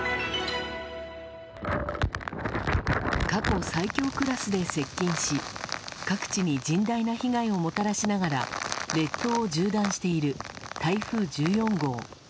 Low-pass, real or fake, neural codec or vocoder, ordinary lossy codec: none; real; none; none